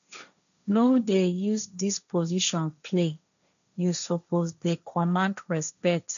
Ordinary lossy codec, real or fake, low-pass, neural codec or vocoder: none; fake; 7.2 kHz; codec, 16 kHz, 1.1 kbps, Voila-Tokenizer